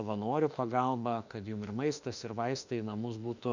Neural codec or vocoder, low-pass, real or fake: autoencoder, 48 kHz, 32 numbers a frame, DAC-VAE, trained on Japanese speech; 7.2 kHz; fake